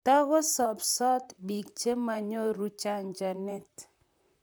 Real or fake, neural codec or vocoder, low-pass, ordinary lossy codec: fake; vocoder, 44.1 kHz, 128 mel bands, Pupu-Vocoder; none; none